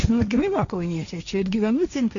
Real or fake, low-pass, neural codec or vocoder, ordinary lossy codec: fake; 7.2 kHz; codec, 16 kHz, 1.1 kbps, Voila-Tokenizer; MP3, 64 kbps